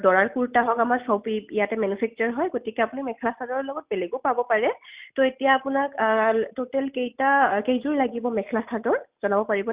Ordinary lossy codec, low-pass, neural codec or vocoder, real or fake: Opus, 64 kbps; 3.6 kHz; none; real